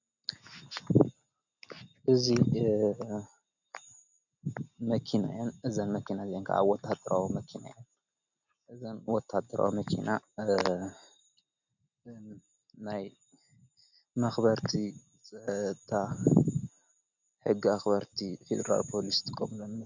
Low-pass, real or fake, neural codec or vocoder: 7.2 kHz; real; none